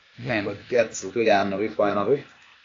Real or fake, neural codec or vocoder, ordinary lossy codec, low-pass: fake; codec, 16 kHz, 0.8 kbps, ZipCodec; AAC, 32 kbps; 7.2 kHz